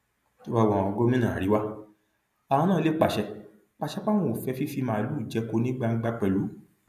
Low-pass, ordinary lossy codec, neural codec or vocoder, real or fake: 14.4 kHz; none; none; real